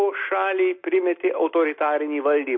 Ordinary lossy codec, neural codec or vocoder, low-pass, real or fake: MP3, 32 kbps; none; 7.2 kHz; real